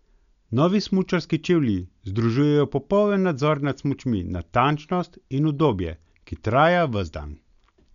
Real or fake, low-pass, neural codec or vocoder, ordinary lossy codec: real; 7.2 kHz; none; none